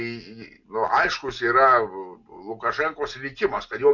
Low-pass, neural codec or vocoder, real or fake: 7.2 kHz; none; real